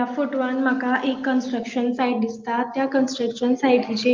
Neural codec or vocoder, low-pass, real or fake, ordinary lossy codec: none; 7.2 kHz; real; Opus, 24 kbps